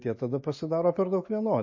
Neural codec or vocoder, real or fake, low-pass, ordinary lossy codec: none; real; 7.2 kHz; MP3, 32 kbps